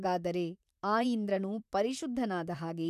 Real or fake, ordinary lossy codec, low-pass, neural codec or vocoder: fake; none; 14.4 kHz; vocoder, 44.1 kHz, 128 mel bands, Pupu-Vocoder